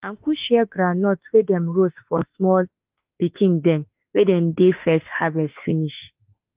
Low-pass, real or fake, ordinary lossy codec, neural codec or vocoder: 3.6 kHz; fake; Opus, 32 kbps; autoencoder, 48 kHz, 32 numbers a frame, DAC-VAE, trained on Japanese speech